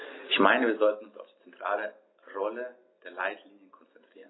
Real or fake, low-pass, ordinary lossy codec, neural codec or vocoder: real; 7.2 kHz; AAC, 16 kbps; none